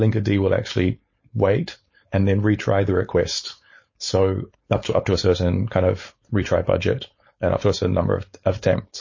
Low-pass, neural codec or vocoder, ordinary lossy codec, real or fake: 7.2 kHz; codec, 16 kHz, 4.8 kbps, FACodec; MP3, 32 kbps; fake